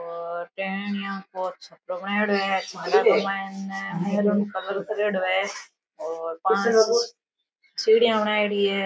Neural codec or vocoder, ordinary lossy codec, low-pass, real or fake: none; none; none; real